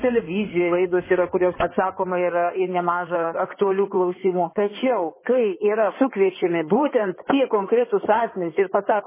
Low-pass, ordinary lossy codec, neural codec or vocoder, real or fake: 3.6 kHz; MP3, 16 kbps; codec, 16 kHz in and 24 kHz out, 2.2 kbps, FireRedTTS-2 codec; fake